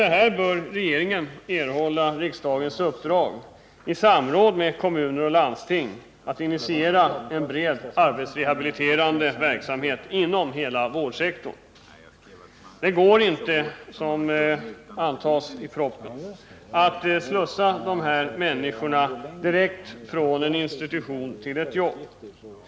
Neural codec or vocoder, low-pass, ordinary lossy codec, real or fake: none; none; none; real